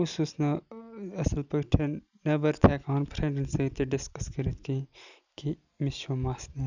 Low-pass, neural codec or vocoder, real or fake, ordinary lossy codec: 7.2 kHz; none; real; none